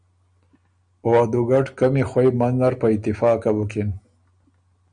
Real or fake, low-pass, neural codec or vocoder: real; 9.9 kHz; none